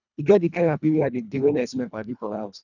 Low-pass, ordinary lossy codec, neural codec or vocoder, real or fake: 7.2 kHz; none; codec, 24 kHz, 1.5 kbps, HILCodec; fake